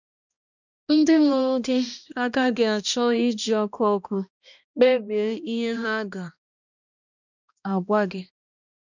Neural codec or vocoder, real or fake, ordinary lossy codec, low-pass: codec, 16 kHz, 1 kbps, X-Codec, HuBERT features, trained on balanced general audio; fake; none; 7.2 kHz